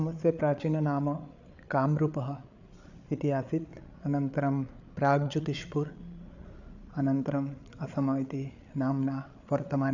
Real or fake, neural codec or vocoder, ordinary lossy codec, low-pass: fake; codec, 16 kHz, 8 kbps, FreqCodec, larger model; none; 7.2 kHz